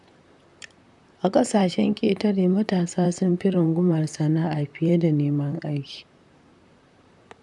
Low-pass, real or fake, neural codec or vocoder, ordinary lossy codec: 10.8 kHz; fake; vocoder, 44.1 kHz, 128 mel bands, Pupu-Vocoder; none